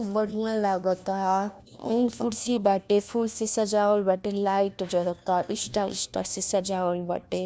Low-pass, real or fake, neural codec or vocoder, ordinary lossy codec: none; fake; codec, 16 kHz, 1 kbps, FunCodec, trained on LibriTTS, 50 frames a second; none